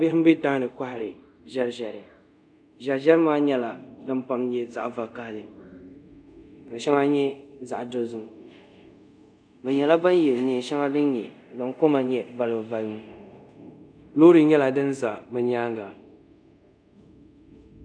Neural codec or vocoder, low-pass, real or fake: codec, 24 kHz, 0.5 kbps, DualCodec; 9.9 kHz; fake